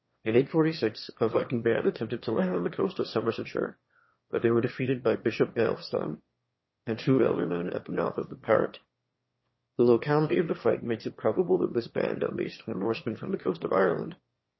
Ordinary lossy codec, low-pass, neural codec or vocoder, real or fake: MP3, 24 kbps; 7.2 kHz; autoencoder, 22.05 kHz, a latent of 192 numbers a frame, VITS, trained on one speaker; fake